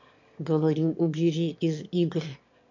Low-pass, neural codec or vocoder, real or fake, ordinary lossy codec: 7.2 kHz; autoencoder, 22.05 kHz, a latent of 192 numbers a frame, VITS, trained on one speaker; fake; MP3, 48 kbps